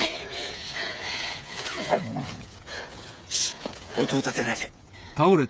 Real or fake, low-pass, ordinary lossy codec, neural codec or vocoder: fake; none; none; codec, 16 kHz, 8 kbps, FreqCodec, smaller model